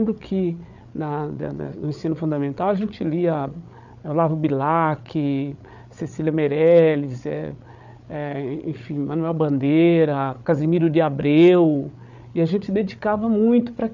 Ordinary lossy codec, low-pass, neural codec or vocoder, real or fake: none; 7.2 kHz; codec, 16 kHz, 8 kbps, FreqCodec, larger model; fake